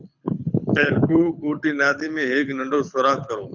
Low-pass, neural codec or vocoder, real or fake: 7.2 kHz; codec, 24 kHz, 6 kbps, HILCodec; fake